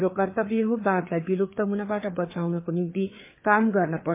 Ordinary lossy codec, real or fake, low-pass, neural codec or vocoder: MP3, 24 kbps; fake; 3.6 kHz; codec, 16 kHz, 4 kbps, FreqCodec, larger model